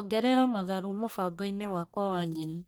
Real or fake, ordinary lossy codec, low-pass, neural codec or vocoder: fake; none; none; codec, 44.1 kHz, 1.7 kbps, Pupu-Codec